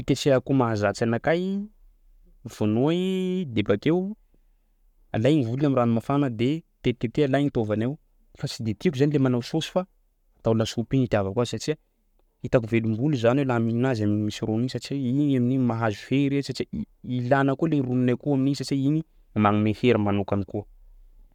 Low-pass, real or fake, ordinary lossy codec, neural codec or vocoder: 19.8 kHz; fake; none; codec, 44.1 kHz, 7.8 kbps, Pupu-Codec